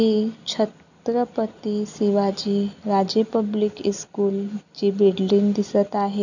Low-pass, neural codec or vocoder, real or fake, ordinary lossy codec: 7.2 kHz; none; real; none